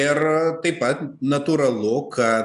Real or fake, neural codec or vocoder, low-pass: real; none; 10.8 kHz